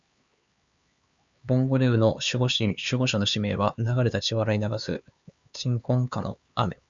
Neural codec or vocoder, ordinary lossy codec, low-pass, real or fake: codec, 16 kHz, 4 kbps, X-Codec, HuBERT features, trained on LibriSpeech; Opus, 64 kbps; 7.2 kHz; fake